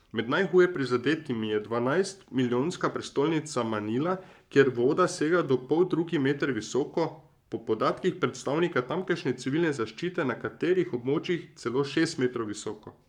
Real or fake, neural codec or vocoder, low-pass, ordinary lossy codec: fake; codec, 44.1 kHz, 7.8 kbps, Pupu-Codec; 19.8 kHz; none